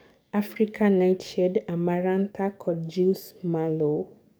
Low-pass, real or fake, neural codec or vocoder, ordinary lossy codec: none; fake; codec, 44.1 kHz, 7.8 kbps, DAC; none